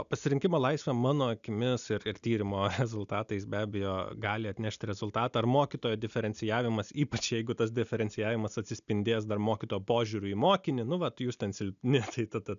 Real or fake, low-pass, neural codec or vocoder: real; 7.2 kHz; none